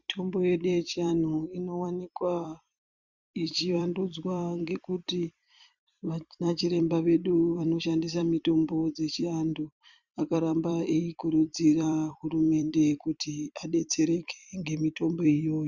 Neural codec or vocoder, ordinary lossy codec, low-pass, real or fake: vocoder, 44.1 kHz, 128 mel bands every 256 samples, BigVGAN v2; Opus, 64 kbps; 7.2 kHz; fake